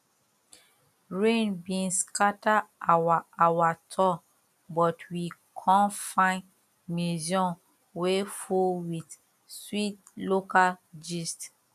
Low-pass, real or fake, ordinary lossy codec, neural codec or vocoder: 14.4 kHz; real; none; none